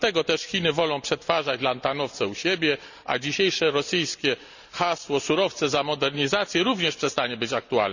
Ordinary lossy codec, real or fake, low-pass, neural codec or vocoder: none; real; 7.2 kHz; none